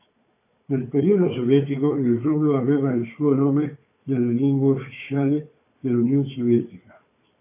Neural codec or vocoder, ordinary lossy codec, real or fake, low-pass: codec, 16 kHz, 4 kbps, FunCodec, trained on Chinese and English, 50 frames a second; AAC, 24 kbps; fake; 3.6 kHz